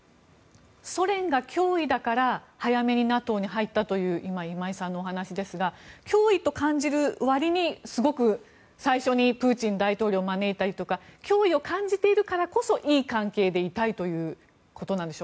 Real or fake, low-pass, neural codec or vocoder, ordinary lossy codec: real; none; none; none